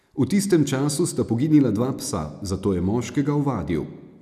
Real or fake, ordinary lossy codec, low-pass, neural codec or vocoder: real; none; 14.4 kHz; none